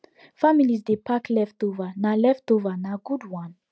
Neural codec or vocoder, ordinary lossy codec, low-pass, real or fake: none; none; none; real